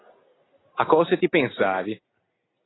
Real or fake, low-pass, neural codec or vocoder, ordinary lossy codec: real; 7.2 kHz; none; AAC, 16 kbps